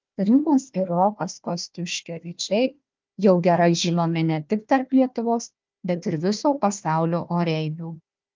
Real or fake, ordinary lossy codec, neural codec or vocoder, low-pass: fake; Opus, 32 kbps; codec, 16 kHz, 1 kbps, FunCodec, trained on Chinese and English, 50 frames a second; 7.2 kHz